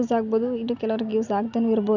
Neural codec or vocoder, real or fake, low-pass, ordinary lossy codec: none; real; 7.2 kHz; none